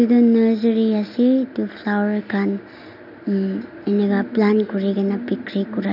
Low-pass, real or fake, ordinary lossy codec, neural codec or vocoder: 5.4 kHz; real; none; none